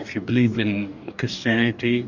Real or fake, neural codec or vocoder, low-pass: fake; codec, 44.1 kHz, 2.6 kbps, DAC; 7.2 kHz